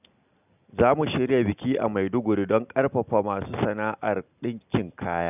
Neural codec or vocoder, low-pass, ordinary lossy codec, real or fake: none; 3.6 kHz; none; real